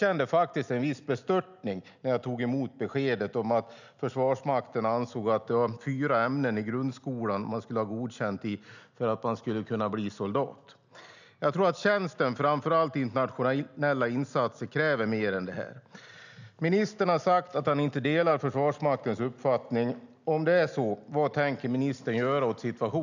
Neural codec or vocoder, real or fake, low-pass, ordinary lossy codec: none; real; 7.2 kHz; none